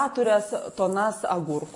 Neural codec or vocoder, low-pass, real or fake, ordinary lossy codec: none; 10.8 kHz; real; MP3, 48 kbps